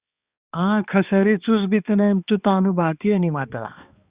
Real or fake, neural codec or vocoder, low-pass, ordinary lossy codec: fake; codec, 16 kHz, 2 kbps, X-Codec, HuBERT features, trained on balanced general audio; 3.6 kHz; Opus, 64 kbps